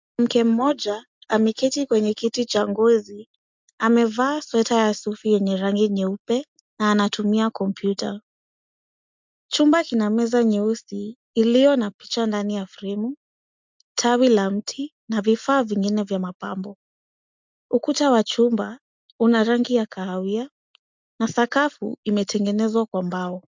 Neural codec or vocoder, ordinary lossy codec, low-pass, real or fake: none; MP3, 64 kbps; 7.2 kHz; real